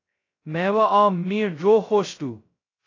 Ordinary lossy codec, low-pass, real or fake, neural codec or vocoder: AAC, 32 kbps; 7.2 kHz; fake; codec, 16 kHz, 0.2 kbps, FocalCodec